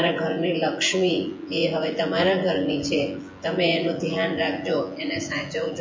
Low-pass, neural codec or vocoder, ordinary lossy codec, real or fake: 7.2 kHz; vocoder, 24 kHz, 100 mel bands, Vocos; MP3, 32 kbps; fake